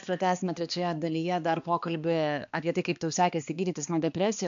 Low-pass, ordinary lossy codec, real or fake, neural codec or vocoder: 7.2 kHz; AAC, 96 kbps; fake; codec, 16 kHz, 2 kbps, X-Codec, HuBERT features, trained on balanced general audio